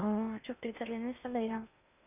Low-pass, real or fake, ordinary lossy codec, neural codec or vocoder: 3.6 kHz; fake; none; codec, 16 kHz in and 24 kHz out, 0.6 kbps, FocalCodec, streaming, 2048 codes